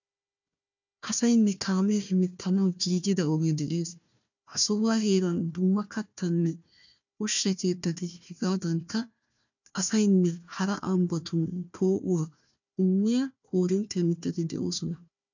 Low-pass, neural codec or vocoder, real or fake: 7.2 kHz; codec, 16 kHz, 1 kbps, FunCodec, trained on Chinese and English, 50 frames a second; fake